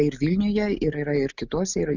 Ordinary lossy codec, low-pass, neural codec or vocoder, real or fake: Opus, 64 kbps; 7.2 kHz; none; real